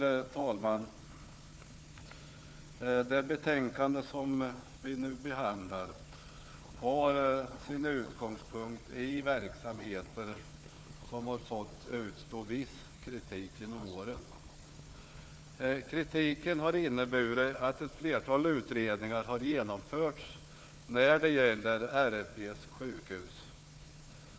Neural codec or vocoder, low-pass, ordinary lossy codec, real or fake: codec, 16 kHz, 4 kbps, FunCodec, trained on Chinese and English, 50 frames a second; none; none; fake